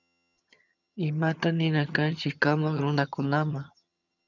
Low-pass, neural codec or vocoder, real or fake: 7.2 kHz; vocoder, 22.05 kHz, 80 mel bands, HiFi-GAN; fake